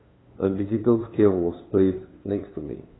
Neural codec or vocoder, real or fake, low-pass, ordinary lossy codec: codec, 16 kHz, 2 kbps, FunCodec, trained on LibriTTS, 25 frames a second; fake; 7.2 kHz; AAC, 16 kbps